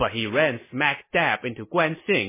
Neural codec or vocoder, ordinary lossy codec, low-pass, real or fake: none; MP3, 16 kbps; 3.6 kHz; real